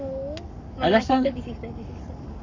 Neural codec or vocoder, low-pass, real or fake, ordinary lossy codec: codec, 44.1 kHz, 7.8 kbps, Pupu-Codec; 7.2 kHz; fake; none